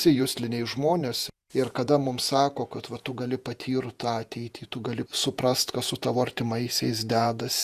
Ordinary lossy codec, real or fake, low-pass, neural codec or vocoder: Opus, 64 kbps; fake; 14.4 kHz; vocoder, 48 kHz, 128 mel bands, Vocos